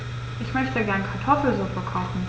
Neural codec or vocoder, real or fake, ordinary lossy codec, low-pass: none; real; none; none